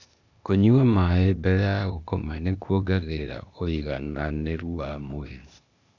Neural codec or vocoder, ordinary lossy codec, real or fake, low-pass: codec, 16 kHz, 0.8 kbps, ZipCodec; none; fake; 7.2 kHz